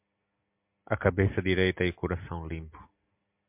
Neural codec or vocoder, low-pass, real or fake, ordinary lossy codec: none; 3.6 kHz; real; MP3, 32 kbps